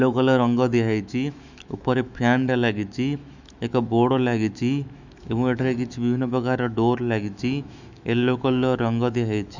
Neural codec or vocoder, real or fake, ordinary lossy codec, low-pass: autoencoder, 48 kHz, 128 numbers a frame, DAC-VAE, trained on Japanese speech; fake; none; 7.2 kHz